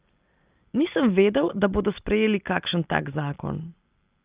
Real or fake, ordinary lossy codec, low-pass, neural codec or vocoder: real; Opus, 24 kbps; 3.6 kHz; none